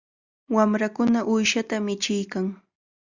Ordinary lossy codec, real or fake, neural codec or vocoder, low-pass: Opus, 64 kbps; real; none; 7.2 kHz